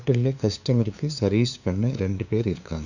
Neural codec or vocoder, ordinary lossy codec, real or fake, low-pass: codec, 16 kHz, 2 kbps, FunCodec, trained on LibriTTS, 25 frames a second; none; fake; 7.2 kHz